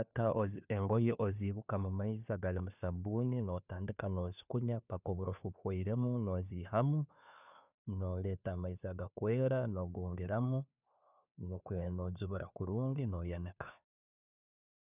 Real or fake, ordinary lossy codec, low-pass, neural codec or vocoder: fake; none; 3.6 kHz; codec, 16 kHz, 2 kbps, FunCodec, trained on Chinese and English, 25 frames a second